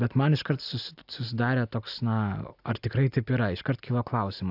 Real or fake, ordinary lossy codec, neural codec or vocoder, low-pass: real; AAC, 48 kbps; none; 5.4 kHz